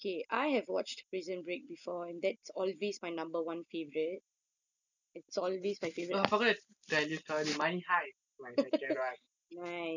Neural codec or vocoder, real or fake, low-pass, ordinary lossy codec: none; real; 7.2 kHz; none